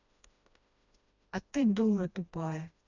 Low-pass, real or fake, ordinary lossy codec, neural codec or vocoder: 7.2 kHz; fake; none; codec, 16 kHz, 1 kbps, FreqCodec, smaller model